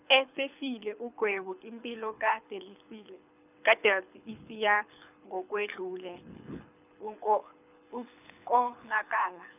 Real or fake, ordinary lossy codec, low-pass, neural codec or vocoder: fake; none; 3.6 kHz; codec, 24 kHz, 6 kbps, HILCodec